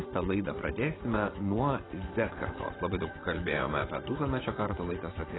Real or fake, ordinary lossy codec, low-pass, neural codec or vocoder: fake; AAC, 16 kbps; 7.2 kHz; vocoder, 24 kHz, 100 mel bands, Vocos